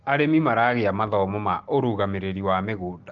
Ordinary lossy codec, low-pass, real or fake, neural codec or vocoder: Opus, 16 kbps; 7.2 kHz; real; none